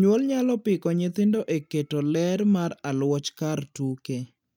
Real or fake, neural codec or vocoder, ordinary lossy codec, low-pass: real; none; none; 19.8 kHz